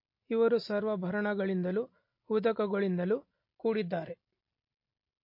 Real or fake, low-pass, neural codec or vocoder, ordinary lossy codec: real; 5.4 kHz; none; MP3, 32 kbps